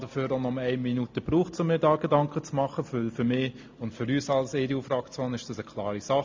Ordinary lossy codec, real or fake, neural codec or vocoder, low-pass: MP3, 48 kbps; real; none; 7.2 kHz